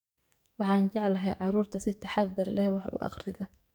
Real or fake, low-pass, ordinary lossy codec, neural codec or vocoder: fake; none; none; codec, 44.1 kHz, 2.6 kbps, SNAC